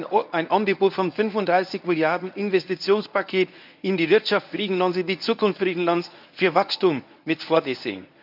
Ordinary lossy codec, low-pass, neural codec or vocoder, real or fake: none; 5.4 kHz; codec, 24 kHz, 0.9 kbps, WavTokenizer, medium speech release version 1; fake